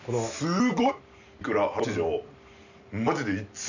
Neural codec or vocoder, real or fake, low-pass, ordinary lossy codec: none; real; 7.2 kHz; none